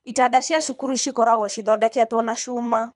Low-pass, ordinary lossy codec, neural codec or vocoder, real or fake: 10.8 kHz; none; codec, 24 kHz, 3 kbps, HILCodec; fake